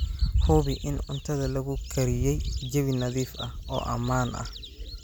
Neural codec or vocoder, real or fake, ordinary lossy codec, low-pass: none; real; none; none